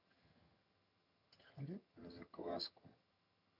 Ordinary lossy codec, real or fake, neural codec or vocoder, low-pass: none; fake; vocoder, 22.05 kHz, 80 mel bands, HiFi-GAN; 5.4 kHz